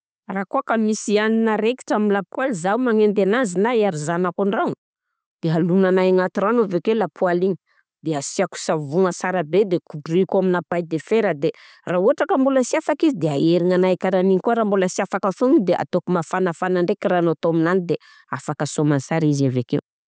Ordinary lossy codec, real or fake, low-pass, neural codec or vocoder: none; real; none; none